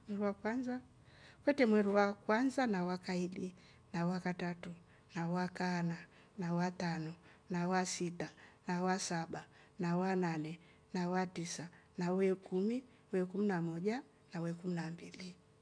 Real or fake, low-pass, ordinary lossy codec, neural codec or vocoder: real; 9.9 kHz; none; none